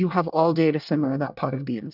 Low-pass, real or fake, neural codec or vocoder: 5.4 kHz; fake; codec, 24 kHz, 1 kbps, SNAC